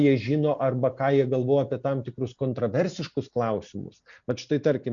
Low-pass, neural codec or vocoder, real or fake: 7.2 kHz; none; real